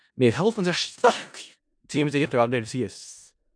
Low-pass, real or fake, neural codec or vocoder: 9.9 kHz; fake; codec, 16 kHz in and 24 kHz out, 0.4 kbps, LongCat-Audio-Codec, four codebook decoder